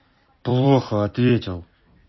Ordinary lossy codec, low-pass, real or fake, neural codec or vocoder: MP3, 24 kbps; 7.2 kHz; fake; vocoder, 44.1 kHz, 128 mel bands every 256 samples, BigVGAN v2